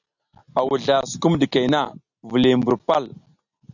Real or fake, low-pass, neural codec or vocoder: real; 7.2 kHz; none